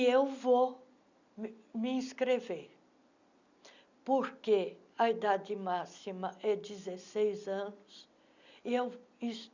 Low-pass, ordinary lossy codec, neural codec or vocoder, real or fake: 7.2 kHz; none; none; real